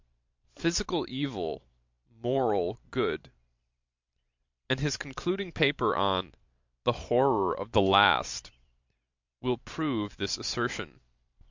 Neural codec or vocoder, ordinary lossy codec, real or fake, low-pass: vocoder, 44.1 kHz, 128 mel bands every 512 samples, BigVGAN v2; MP3, 64 kbps; fake; 7.2 kHz